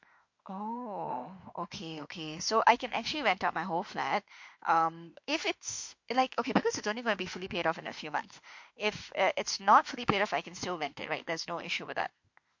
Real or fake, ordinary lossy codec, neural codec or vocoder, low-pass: fake; MP3, 48 kbps; autoencoder, 48 kHz, 32 numbers a frame, DAC-VAE, trained on Japanese speech; 7.2 kHz